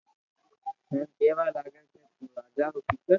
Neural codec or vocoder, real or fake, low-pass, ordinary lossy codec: none; real; 7.2 kHz; MP3, 64 kbps